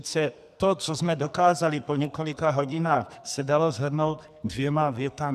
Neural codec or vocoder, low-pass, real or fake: codec, 44.1 kHz, 2.6 kbps, SNAC; 14.4 kHz; fake